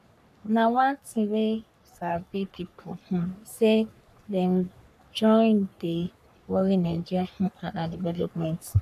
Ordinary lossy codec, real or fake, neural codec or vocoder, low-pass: none; fake; codec, 44.1 kHz, 3.4 kbps, Pupu-Codec; 14.4 kHz